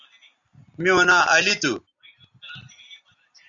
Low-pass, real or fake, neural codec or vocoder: 7.2 kHz; real; none